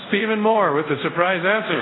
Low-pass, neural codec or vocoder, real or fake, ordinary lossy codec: 7.2 kHz; codec, 24 kHz, 0.5 kbps, DualCodec; fake; AAC, 16 kbps